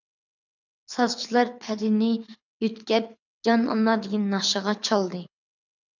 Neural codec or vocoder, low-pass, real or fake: codec, 24 kHz, 6 kbps, HILCodec; 7.2 kHz; fake